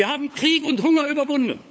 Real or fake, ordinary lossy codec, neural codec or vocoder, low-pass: fake; none; codec, 16 kHz, 8 kbps, FunCodec, trained on LibriTTS, 25 frames a second; none